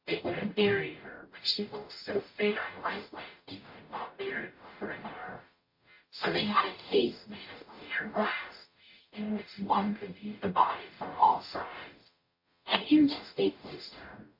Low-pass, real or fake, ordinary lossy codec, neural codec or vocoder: 5.4 kHz; fake; MP3, 32 kbps; codec, 44.1 kHz, 0.9 kbps, DAC